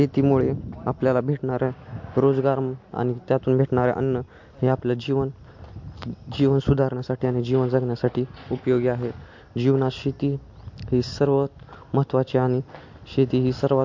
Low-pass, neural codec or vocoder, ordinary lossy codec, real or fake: 7.2 kHz; none; MP3, 48 kbps; real